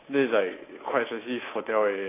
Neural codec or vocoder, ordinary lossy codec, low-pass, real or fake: codec, 24 kHz, 3.1 kbps, DualCodec; AAC, 16 kbps; 3.6 kHz; fake